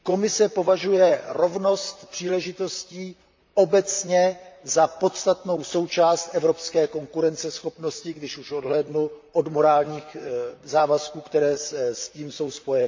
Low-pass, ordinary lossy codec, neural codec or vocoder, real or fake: 7.2 kHz; MP3, 64 kbps; vocoder, 44.1 kHz, 128 mel bands, Pupu-Vocoder; fake